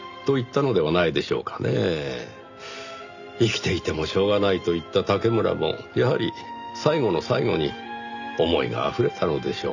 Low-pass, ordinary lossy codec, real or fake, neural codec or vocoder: 7.2 kHz; none; real; none